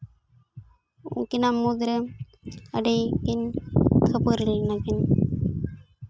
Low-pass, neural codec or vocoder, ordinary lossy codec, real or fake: none; none; none; real